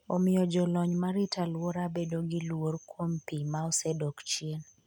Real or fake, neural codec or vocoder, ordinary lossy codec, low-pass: real; none; MP3, 96 kbps; 19.8 kHz